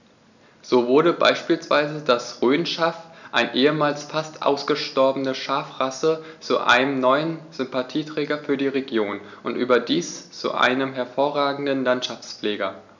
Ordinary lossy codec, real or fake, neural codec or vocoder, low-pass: none; real; none; 7.2 kHz